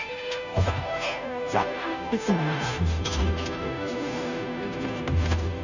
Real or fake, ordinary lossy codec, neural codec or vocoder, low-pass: fake; none; codec, 16 kHz, 0.5 kbps, FunCodec, trained on Chinese and English, 25 frames a second; 7.2 kHz